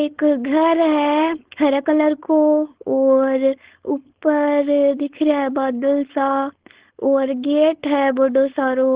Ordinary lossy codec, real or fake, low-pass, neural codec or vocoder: Opus, 16 kbps; fake; 3.6 kHz; codec, 16 kHz, 4.8 kbps, FACodec